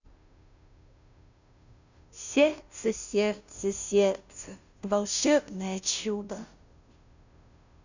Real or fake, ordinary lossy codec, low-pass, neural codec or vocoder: fake; none; 7.2 kHz; codec, 16 kHz, 0.5 kbps, FunCodec, trained on Chinese and English, 25 frames a second